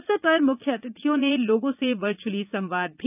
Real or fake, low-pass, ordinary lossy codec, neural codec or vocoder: fake; 3.6 kHz; none; vocoder, 44.1 kHz, 80 mel bands, Vocos